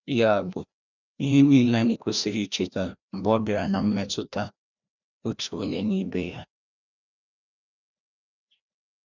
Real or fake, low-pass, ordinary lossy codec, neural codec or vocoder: fake; 7.2 kHz; none; codec, 16 kHz, 1 kbps, FreqCodec, larger model